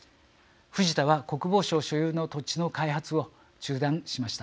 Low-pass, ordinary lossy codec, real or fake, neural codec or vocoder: none; none; real; none